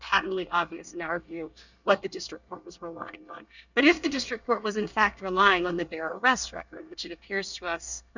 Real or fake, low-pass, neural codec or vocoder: fake; 7.2 kHz; codec, 24 kHz, 1 kbps, SNAC